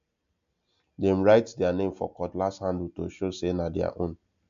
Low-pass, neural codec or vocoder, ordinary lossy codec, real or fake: 7.2 kHz; none; AAC, 64 kbps; real